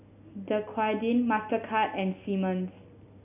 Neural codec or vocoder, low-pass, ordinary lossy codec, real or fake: none; 3.6 kHz; none; real